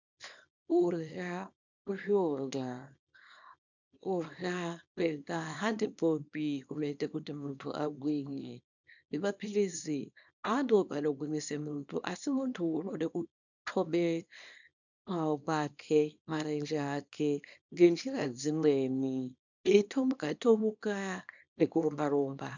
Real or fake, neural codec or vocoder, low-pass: fake; codec, 24 kHz, 0.9 kbps, WavTokenizer, small release; 7.2 kHz